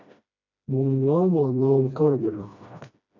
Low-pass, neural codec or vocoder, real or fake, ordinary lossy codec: 7.2 kHz; codec, 16 kHz, 1 kbps, FreqCodec, smaller model; fake; AAC, 48 kbps